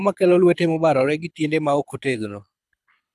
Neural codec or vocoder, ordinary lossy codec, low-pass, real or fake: codec, 24 kHz, 6 kbps, HILCodec; none; none; fake